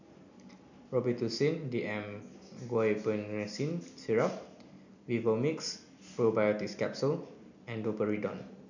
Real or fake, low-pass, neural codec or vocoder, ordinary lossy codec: real; 7.2 kHz; none; none